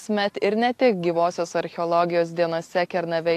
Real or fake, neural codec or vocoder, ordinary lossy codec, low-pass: real; none; AAC, 64 kbps; 14.4 kHz